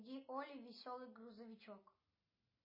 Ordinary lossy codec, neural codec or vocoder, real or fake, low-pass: MP3, 24 kbps; none; real; 5.4 kHz